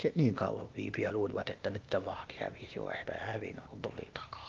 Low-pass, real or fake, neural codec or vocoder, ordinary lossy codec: 7.2 kHz; fake; codec, 16 kHz, 0.8 kbps, ZipCodec; Opus, 32 kbps